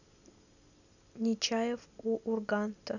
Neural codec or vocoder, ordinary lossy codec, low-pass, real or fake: none; none; 7.2 kHz; real